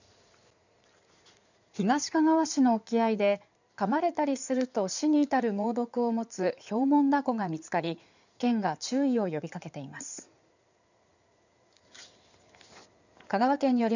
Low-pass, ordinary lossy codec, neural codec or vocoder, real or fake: 7.2 kHz; none; codec, 16 kHz in and 24 kHz out, 2.2 kbps, FireRedTTS-2 codec; fake